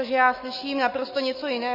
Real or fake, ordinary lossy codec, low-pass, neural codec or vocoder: fake; MP3, 24 kbps; 5.4 kHz; autoencoder, 48 kHz, 128 numbers a frame, DAC-VAE, trained on Japanese speech